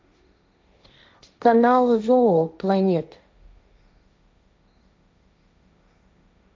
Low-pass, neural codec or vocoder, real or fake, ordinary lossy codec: none; codec, 16 kHz, 1.1 kbps, Voila-Tokenizer; fake; none